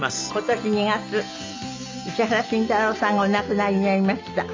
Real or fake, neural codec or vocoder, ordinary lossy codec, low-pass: real; none; MP3, 64 kbps; 7.2 kHz